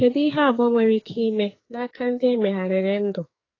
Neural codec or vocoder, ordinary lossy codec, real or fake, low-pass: codec, 44.1 kHz, 2.6 kbps, SNAC; AAC, 32 kbps; fake; 7.2 kHz